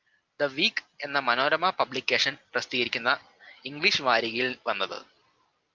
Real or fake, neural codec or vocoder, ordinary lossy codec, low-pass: real; none; Opus, 24 kbps; 7.2 kHz